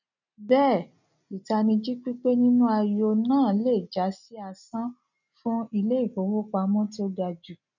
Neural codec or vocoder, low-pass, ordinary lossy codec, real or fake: none; 7.2 kHz; none; real